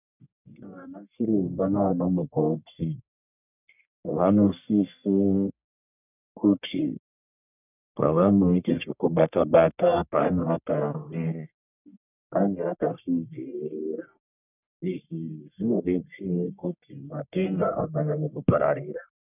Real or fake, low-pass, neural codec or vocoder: fake; 3.6 kHz; codec, 44.1 kHz, 1.7 kbps, Pupu-Codec